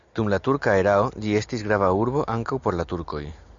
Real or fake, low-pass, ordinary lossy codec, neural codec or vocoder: real; 7.2 kHz; Opus, 64 kbps; none